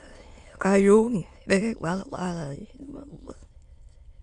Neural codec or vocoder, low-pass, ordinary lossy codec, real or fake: autoencoder, 22.05 kHz, a latent of 192 numbers a frame, VITS, trained on many speakers; 9.9 kHz; Opus, 64 kbps; fake